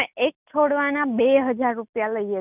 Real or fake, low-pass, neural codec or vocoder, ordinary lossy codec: real; 3.6 kHz; none; none